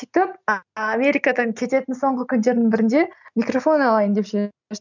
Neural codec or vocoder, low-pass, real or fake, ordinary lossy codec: none; 7.2 kHz; real; none